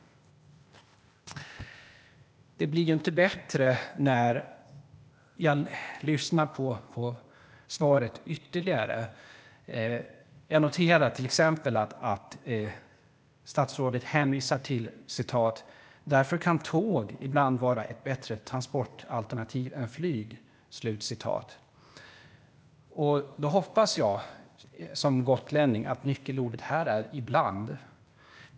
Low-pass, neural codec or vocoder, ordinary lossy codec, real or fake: none; codec, 16 kHz, 0.8 kbps, ZipCodec; none; fake